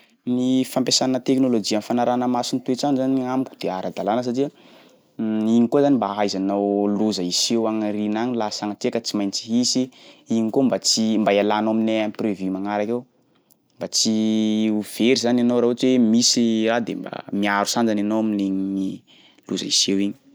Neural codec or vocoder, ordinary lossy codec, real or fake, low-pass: none; none; real; none